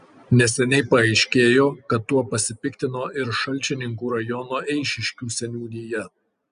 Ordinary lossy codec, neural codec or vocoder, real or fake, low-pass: Opus, 64 kbps; none; real; 9.9 kHz